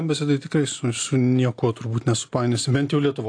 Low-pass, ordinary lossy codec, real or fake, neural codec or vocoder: 9.9 kHz; AAC, 48 kbps; real; none